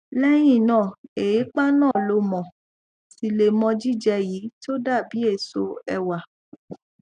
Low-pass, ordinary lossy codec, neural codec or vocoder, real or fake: 9.9 kHz; none; none; real